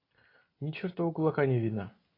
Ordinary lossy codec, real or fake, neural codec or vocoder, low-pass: AAC, 24 kbps; real; none; 5.4 kHz